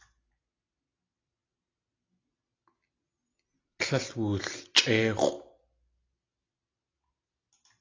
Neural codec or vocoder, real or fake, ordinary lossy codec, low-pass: none; real; AAC, 32 kbps; 7.2 kHz